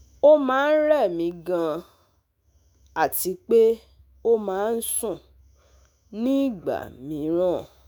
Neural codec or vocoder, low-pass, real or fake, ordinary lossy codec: autoencoder, 48 kHz, 128 numbers a frame, DAC-VAE, trained on Japanese speech; none; fake; none